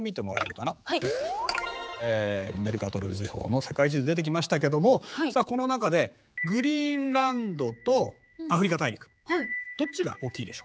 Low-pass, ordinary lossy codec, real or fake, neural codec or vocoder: none; none; fake; codec, 16 kHz, 4 kbps, X-Codec, HuBERT features, trained on general audio